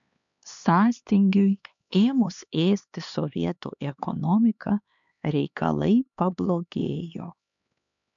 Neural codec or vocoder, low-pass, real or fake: codec, 16 kHz, 2 kbps, X-Codec, HuBERT features, trained on LibriSpeech; 7.2 kHz; fake